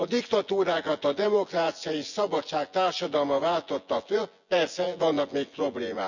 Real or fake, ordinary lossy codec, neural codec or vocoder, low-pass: fake; none; vocoder, 24 kHz, 100 mel bands, Vocos; 7.2 kHz